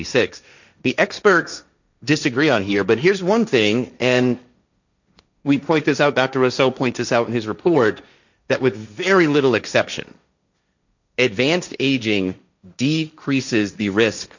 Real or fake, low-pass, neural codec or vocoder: fake; 7.2 kHz; codec, 16 kHz, 1.1 kbps, Voila-Tokenizer